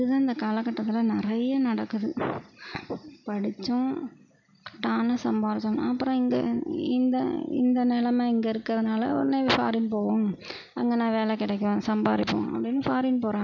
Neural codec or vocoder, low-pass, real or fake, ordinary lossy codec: none; 7.2 kHz; real; none